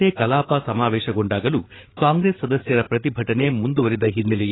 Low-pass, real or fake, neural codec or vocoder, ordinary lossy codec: 7.2 kHz; fake; codec, 16 kHz, 8 kbps, FreqCodec, larger model; AAC, 16 kbps